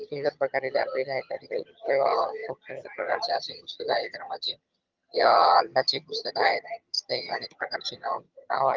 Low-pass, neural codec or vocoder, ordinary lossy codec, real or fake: 7.2 kHz; vocoder, 22.05 kHz, 80 mel bands, HiFi-GAN; Opus, 32 kbps; fake